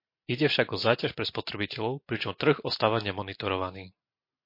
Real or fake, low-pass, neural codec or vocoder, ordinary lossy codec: real; 5.4 kHz; none; MP3, 32 kbps